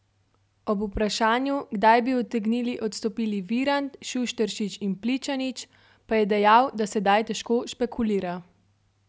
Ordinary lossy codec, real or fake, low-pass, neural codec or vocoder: none; real; none; none